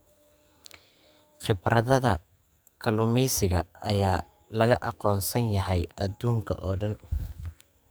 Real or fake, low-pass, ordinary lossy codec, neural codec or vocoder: fake; none; none; codec, 44.1 kHz, 2.6 kbps, SNAC